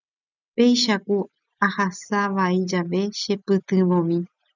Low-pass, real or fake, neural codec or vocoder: 7.2 kHz; real; none